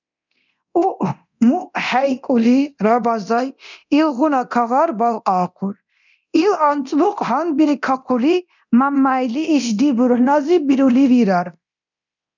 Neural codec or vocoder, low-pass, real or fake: codec, 24 kHz, 0.9 kbps, DualCodec; 7.2 kHz; fake